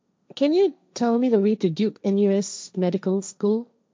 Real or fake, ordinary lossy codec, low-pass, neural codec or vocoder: fake; none; none; codec, 16 kHz, 1.1 kbps, Voila-Tokenizer